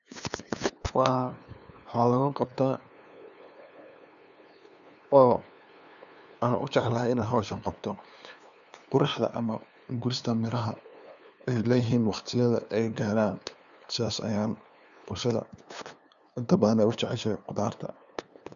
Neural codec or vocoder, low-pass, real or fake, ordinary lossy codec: codec, 16 kHz, 2 kbps, FunCodec, trained on LibriTTS, 25 frames a second; 7.2 kHz; fake; none